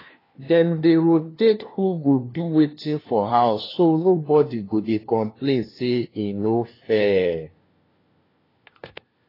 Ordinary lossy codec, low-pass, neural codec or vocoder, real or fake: AAC, 24 kbps; 5.4 kHz; codec, 16 kHz, 1 kbps, FunCodec, trained on LibriTTS, 50 frames a second; fake